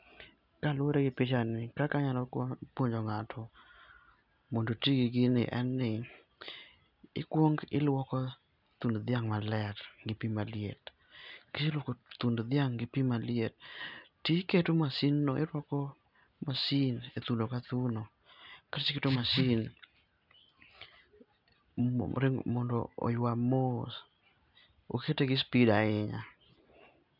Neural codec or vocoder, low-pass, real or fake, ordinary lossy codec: none; 5.4 kHz; real; none